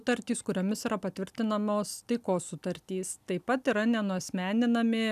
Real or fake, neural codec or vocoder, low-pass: real; none; 14.4 kHz